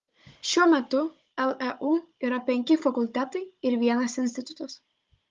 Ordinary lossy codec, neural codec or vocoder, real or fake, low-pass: Opus, 32 kbps; codec, 16 kHz, 16 kbps, FunCodec, trained on Chinese and English, 50 frames a second; fake; 7.2 kHz